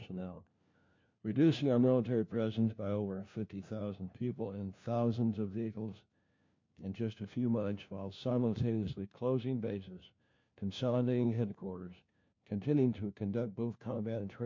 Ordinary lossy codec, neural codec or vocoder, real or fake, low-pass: MP3, 48 kbps; codec, 16 kHz, 1 kbps, FunCodec, trained on LibriTTS, 50 frames a second; fake; 7.2 kHz